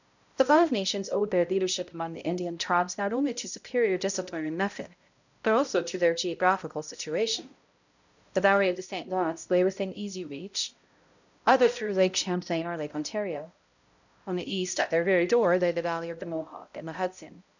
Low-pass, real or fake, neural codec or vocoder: 7.2 kHz; fake; codec, 16 kHz, 0.5 kbps, X-Codec, HuBERT features, trained on balanced general audio